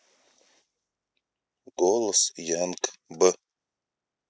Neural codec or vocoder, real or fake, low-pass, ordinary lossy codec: none; real; none; none